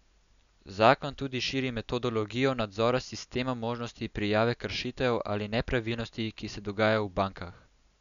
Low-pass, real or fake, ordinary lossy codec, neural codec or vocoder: 7.2 kHz; real; none; none